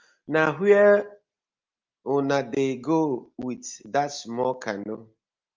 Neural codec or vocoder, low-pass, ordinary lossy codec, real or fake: none; 7.2 kHz; Opus, 24 kbps; real